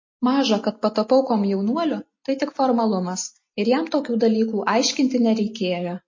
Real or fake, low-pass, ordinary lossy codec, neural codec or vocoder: real; 7.2 kHz; MP3, 32 kbps; none